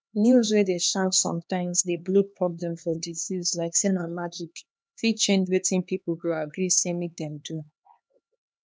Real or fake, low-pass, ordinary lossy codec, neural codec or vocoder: fake; none; none; codec, 16 kHz, 2 kbps, X-Codec, HuBERT features, trained on LibriSpeech